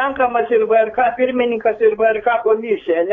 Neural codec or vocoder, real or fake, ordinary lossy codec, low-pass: codec, 16 kHz, 4 kbps, X-Codec, HuBERT features, trained on balanced general audio; fake; AAC, 32 kbps; 7.2 kHz